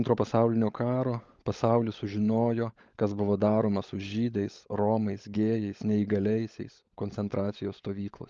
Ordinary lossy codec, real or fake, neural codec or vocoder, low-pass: Opus, 24 kbps; fake; codec, 16 kHz, 16 kbps, FunCodec, trained on LibriTTS, 50 frames a second; 7.2 kHz